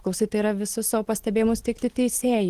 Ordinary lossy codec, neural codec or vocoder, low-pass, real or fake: Opus, 16 kbps; none; 14.4 kHz; real